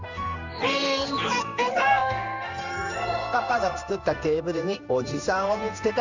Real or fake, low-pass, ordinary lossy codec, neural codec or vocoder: fake; 7.2 kHz; none; codec, 16 kHz in and 24 kHz out, 1 kbps, XY-Tokenizer